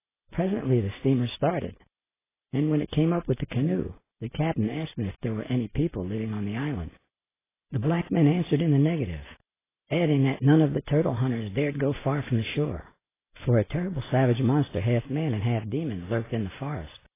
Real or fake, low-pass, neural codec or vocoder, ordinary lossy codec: real; 3.6 kHz; none; AAC, 16 kbps